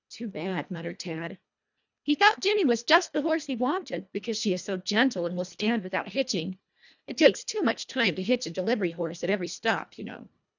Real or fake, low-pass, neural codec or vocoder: fake; 7.2 kHz; codec, 24 kHz, 1.5 kbps, HILCodec